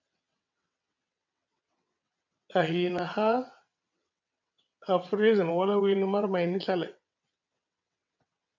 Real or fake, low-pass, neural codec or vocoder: fake; 7.2 kHz; vocoder, 22.05 kHz, 80 mel bands, WaveNeXt